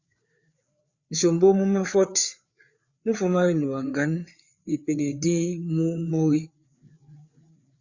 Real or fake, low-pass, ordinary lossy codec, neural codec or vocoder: fake; 7.2 kHz; Opus, 64 kbps; codec, 16 kHz, 4 kbps, FreqCodec, larger model